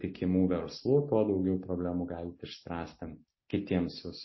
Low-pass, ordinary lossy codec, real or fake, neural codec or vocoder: 7.2 kHz; MP3, 24 kbps; real; none